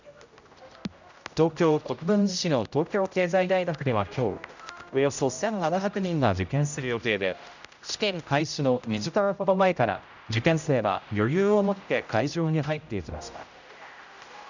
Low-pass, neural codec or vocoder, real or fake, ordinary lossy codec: 7.2 kHz; codec, 16 kHz, 0.5 kbps, X-Codec, HuBERT features, trained on general audio; fake; none